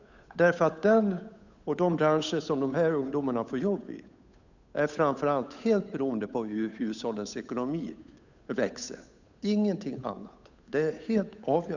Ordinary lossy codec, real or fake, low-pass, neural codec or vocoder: none; fake; 7.2 kHz; codec, 16 kHz, 8 kbps, FunCodec, trained on Chinese and English, 25 frames a second